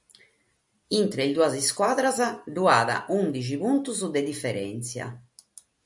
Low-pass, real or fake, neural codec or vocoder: 10.8 kHz; real; none